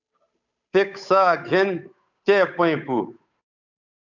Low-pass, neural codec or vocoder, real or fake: 7.2 kHz; codec, 16 kHz, 8 kbps, FunCodec, trained on Chinese and English, 25 frames a second; fake